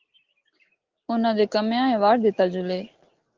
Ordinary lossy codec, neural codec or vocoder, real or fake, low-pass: Opus, 16 kbps; none; real; 7.2 kHz